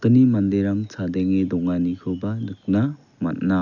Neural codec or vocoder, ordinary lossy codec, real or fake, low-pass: none; none; real; 7.2 kHz